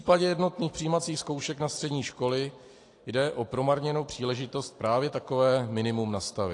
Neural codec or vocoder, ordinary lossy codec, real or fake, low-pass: none; AAC, 48 kbps; real; 10.8 kHz